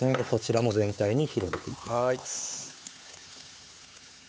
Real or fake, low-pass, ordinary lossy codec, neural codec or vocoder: fake; none; none; codec, 16 kHz, 4 kbps, X-Codec, HuBERT features, trained on LibriSpeech